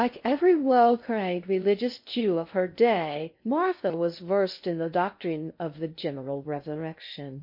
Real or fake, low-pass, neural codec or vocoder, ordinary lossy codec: fake; 5.4 kHz; codec, 16 kHz in and 24 kHz out, 0.6 kbps, FocalCodec, streaming, 2048 codes; MP3, 32 kbps